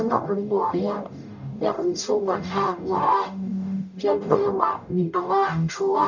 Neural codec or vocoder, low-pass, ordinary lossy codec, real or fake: codec, 44.1 kHz, 0.9 kbps, DAC; 7.2 kHz; none; fake